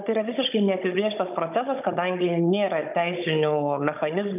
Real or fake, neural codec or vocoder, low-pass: fake; codec, 16 kHz, 16 kbps, FunCodec, trained on Chinese and English, 50 frames a second; 3.6 kHz